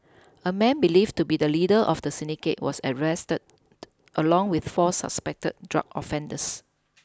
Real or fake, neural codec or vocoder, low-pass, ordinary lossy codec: real; none; none; none